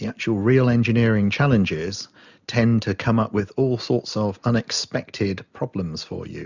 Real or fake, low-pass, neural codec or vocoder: real; 7.2 kHz; none